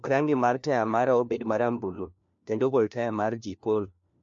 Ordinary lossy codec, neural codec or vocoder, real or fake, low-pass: MP3, 48 kbps; codec, 16 kHz, 1 kbps, FunCodec, trained on LibriTTS, 50 frames a second; fake; 7.2 kHz